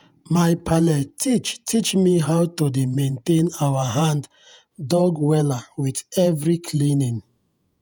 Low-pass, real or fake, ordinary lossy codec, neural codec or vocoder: none; fake; none; vocoder, 48 kHz, 128 mel bands, Vocos